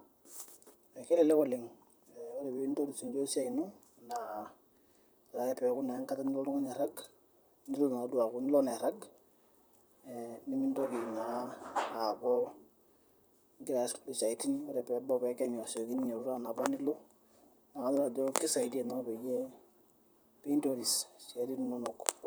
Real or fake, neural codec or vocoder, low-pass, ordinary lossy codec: fake; vocoder, 44.1 kHz, 128 mel bands, Pupu-Vocoder; none; none